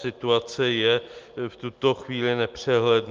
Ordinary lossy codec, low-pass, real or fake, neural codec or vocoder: Opus, 24 kbps; 7.2 kHz; real; none